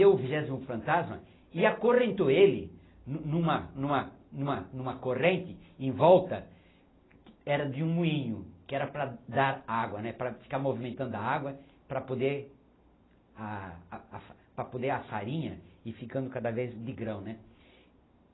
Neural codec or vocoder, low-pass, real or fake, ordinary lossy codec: none; 7.2 kHz; real; AAC, 16 kbps